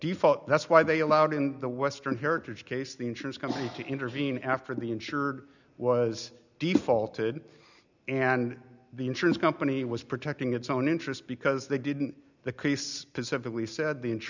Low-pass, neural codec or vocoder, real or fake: 7.2 kHz; none; real